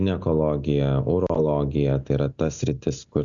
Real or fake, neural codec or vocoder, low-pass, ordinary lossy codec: real; none; 7.2 kHz; MP3, 96 kbps